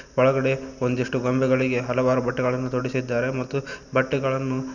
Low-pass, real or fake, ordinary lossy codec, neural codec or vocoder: 7.2 kHz; real; none; none